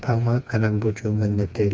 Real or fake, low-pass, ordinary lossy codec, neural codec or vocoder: fake; none; none; codec, 16 kHz, 2 kbps, FreqCodec, smaller model